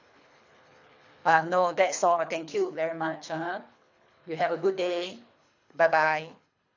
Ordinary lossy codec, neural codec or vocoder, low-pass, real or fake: AAC, 48 kbps; codec, 24 kHz, 3 kbps, HILCodec; 7.2 kHz; fake